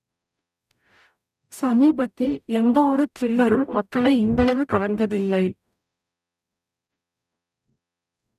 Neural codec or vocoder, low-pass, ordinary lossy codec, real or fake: codec, 44.1 kHz, 0.9 kbps, DAC; 14.4 kHz; none; fake